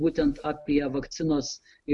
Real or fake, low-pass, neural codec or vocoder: real; 10.8 kHz; none